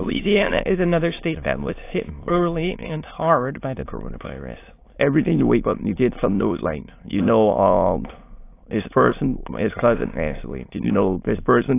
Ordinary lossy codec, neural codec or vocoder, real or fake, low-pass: AAC, 24 kbps; autoencoder, 22.05 kHz, a latent of 192 numbers a frame, VITS, trained on many speakers; fake; 3.6 kHz